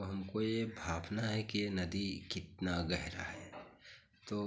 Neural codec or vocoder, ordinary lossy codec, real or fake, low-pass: none; none; real; none